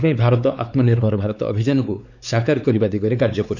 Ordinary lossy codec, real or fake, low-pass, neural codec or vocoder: none; fake; 7.2 kHz; codec, 16 kHz, 4 kbps, X-Codec, WavLM features, trained on Multilingual LibriSpeech